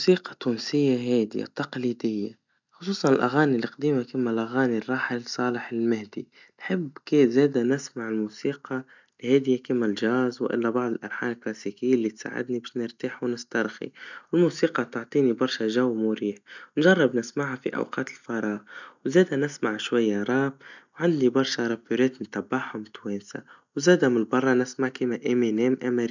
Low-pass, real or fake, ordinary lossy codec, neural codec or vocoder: 7.2 kHz; real; none; none